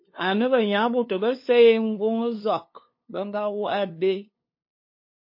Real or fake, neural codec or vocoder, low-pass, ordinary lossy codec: fake; codec, 16 kHz, 2 kbps, FunCodec, trained on LibriTTS, 25 frames a second; 5.4 kHz; MP3, 32 kbps